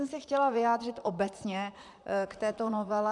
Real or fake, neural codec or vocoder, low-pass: fake; vocoder, 44.1 kHz, 128 mel bands, Pupu-Vocoder; 10.8 kHz